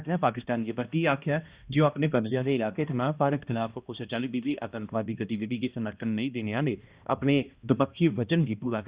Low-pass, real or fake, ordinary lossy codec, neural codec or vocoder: 3.6 kHz; fake; Opus, 64 kbps; codec, 16 kHz, 1 kbps, X-Codec, HuBERT features, trained on balanced general audio